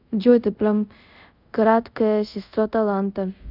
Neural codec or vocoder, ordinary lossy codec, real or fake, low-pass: codec, 24 kHz, 0.5 kbps, DualCodec; none; fake; 5.4 kHz